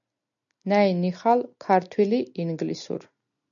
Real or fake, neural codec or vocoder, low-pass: real; none; 7.2 kHz